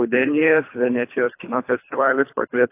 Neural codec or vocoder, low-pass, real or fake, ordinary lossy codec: codec, 24 kHz, 3 kbps, HILCodec; 3.6 kHz; fake; AAC, 24 kbps